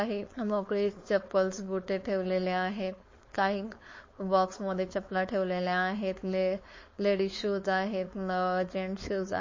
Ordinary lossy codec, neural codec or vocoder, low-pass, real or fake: MP3, 32 kbps; codec, 16 kHz, 4.8 kbps, FACodec; 7.2 kHz; fake